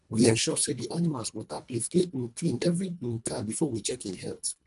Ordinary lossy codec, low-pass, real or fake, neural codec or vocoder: none; 10.8 kHz; fake; codec, 24 kHz, 1.5 kbps, HILCodec